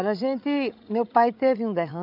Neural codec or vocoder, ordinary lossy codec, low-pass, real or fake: codec, 16 kHz, 8 kbps, FreqCodec, larger model; none; 5.4 kHz; fake